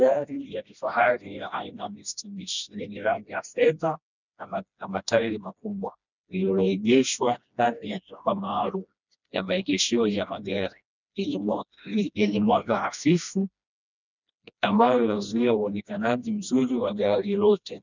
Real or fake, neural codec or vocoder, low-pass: fake; codec, 16 kHz, 1 kbps, FreqCodec, smaller model; 7.2 kHz